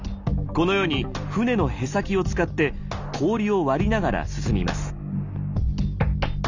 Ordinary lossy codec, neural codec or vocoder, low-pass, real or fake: none; none; 7.2 kHz; real